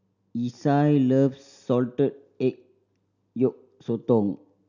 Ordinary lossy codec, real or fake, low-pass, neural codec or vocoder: none; real; 7.2 kHz; none